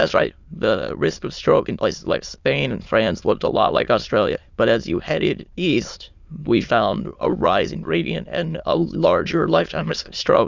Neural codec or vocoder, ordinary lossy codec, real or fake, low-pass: autoencoder, 22.05 kHz, a latent of 192 numbers a frame, VITS, trained on many speakers; Opus, 64 kbps; fake; 7.2 kHz